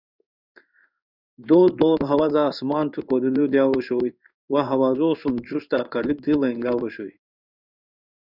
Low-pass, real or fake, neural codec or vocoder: 5.4 kHz; fake; codec, 16 kHz in and 24 kHz out, 1 kbps, XY-Tokenizer